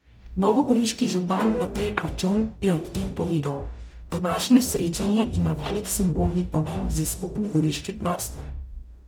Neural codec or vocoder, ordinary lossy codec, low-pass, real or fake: codec, 44.1 kHz, 0.9 kbps, DAC; none; none; fake